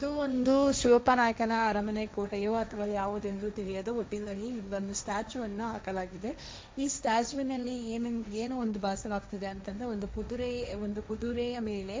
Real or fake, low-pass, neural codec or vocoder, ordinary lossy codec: fake; none; codec, 16 kHz, 1.1 kbps, Voila-Tokenizer; none